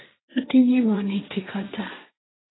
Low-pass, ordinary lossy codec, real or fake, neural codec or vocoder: 7.2 kHz; AAC, 16 kbps; fake; codec, 16 kHz, 1.1 kbps, Voila-Tokenizer